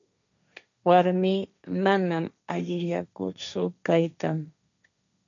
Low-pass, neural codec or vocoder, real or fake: 7.2 kHz; codec, 16 kHz, 1.1 kbps, Voila-Tokenizer; fake